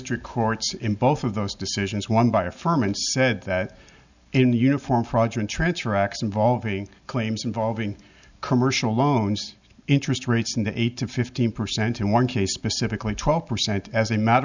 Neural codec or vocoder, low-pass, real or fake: none; 7.2 kHz; real